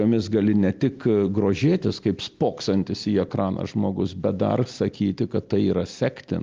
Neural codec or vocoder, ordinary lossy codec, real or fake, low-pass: none; Opus, 24 kbps; real; 7.2 kHz